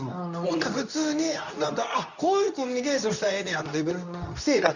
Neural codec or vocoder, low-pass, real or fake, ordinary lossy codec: codec, 24 kHz, 0.9 kbps, WavTokenizer, medium speech release version 2; 7.2 kHz; fake; none